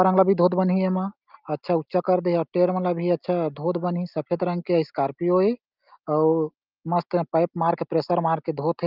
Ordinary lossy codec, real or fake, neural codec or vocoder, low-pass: Opus, 24 kbps; real; none; 5.4 kHz